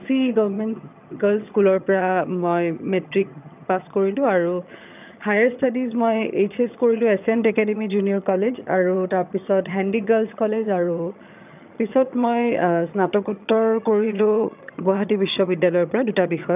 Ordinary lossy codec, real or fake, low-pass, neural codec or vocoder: none; fake; 3.6 kHz; vocoder, 22.05 kHz, 80 mel bands, HiFi-GAN